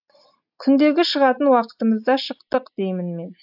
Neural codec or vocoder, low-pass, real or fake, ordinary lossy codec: none; 5.4 kHz; real; AAC, 48 kbps